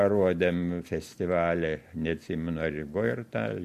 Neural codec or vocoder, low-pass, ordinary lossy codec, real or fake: none; 14.4 kHz; MP3, 64 kbps; real